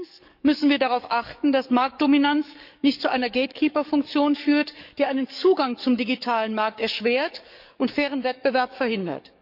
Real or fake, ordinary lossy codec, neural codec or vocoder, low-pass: fake; none; codec, 44.1 kHz, 7.8 kbps, DAC; 5.4 kHz